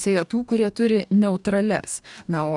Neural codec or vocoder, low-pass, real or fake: codec, 44.1 kHz, 2.6 kbps, DAC; 10.8 kHz; fake